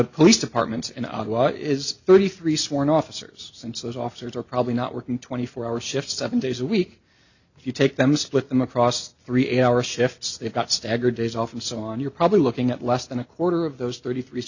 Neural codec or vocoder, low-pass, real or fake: none; 7.2 kHz; real